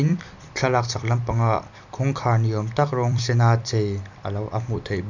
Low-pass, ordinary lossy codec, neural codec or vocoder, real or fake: 7.2 kHz; none; vocoder, 44.1 kHz, 128 mel bands every 256 samples, BigVGAN v2; fake